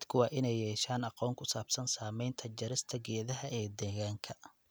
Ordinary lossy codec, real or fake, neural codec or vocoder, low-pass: none; real; none; none